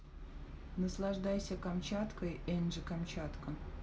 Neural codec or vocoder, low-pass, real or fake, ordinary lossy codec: none; none; real; none